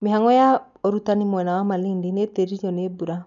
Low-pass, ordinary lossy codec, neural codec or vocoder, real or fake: 7.2 kHz; none; none; real